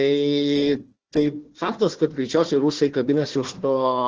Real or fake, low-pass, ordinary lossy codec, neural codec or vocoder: fake; 7.2 kHz; Opus, 16 kbps; codec, 16 kHz, 1 kbps, FunCodec, trained on Chinese and English, 50 frames a second